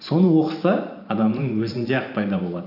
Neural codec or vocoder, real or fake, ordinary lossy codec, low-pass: vocoder, 44.1 kHz, 128 mel bands every 512 samples, BigVGAN v2; fake; none; 5.4 kHz